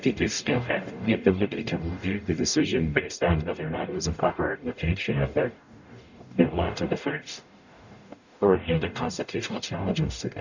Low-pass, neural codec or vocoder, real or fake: 7.2 kHz; codec, 44.1 kHz, 0.9 kbps, DAC; fake